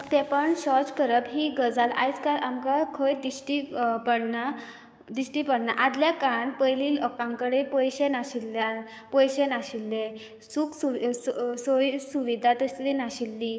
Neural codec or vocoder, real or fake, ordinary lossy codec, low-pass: codec, 16 kHz, 6 kbps, DAC; fake; none; none